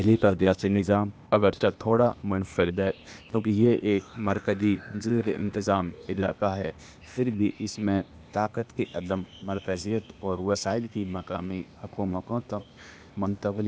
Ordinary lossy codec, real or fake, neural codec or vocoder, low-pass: none; fake; codec, 16 kHz, 0.8 kbps, ZipCodec; none